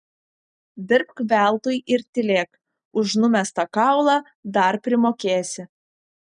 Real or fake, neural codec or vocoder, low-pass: real; none; 9.9 kHz